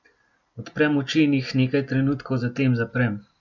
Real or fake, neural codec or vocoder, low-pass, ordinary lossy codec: real; none; 7.2 kHz; none